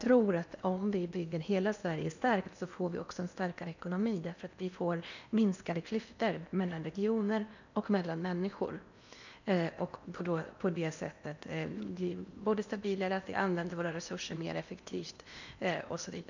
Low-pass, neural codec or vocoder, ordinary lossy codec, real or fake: 7.2 kHz; codec, 16 kHz in and 24 kHz out, 0.8 kbps, FocalCodec, streaming, 65536 codes; none; fake